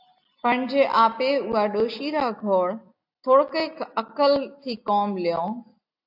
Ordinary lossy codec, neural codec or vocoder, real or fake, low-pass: AAC, 48 kbps; none; real; 5.4 kHz